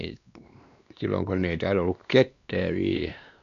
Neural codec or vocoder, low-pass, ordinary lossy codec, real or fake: codec, 16 kHz, 2 kbps, X-Codec, WavLM features, trained on Multilingual LibriSpeech; 7.2 kHz; none; fake